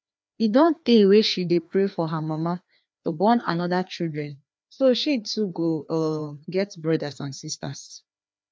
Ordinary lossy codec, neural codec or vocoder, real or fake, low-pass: none; codec, 16 kHz, 2 kbps, FreqCodec, larger model; fake; none